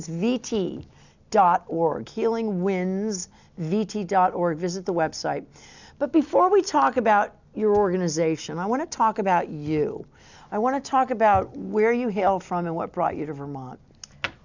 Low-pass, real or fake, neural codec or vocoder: 7.2 kHz; real; none